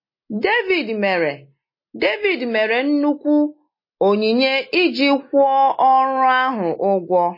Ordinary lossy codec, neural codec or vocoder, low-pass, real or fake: MP3, 24 kbps; none; 5.4 kHz; real